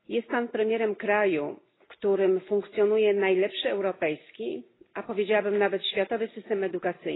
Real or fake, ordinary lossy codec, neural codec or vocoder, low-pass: real; AAC, 16 kbps; none; 7.2 kHz